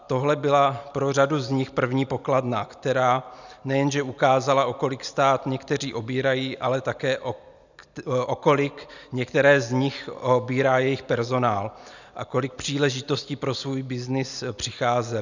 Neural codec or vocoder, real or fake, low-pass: none; real; 7.2 kHz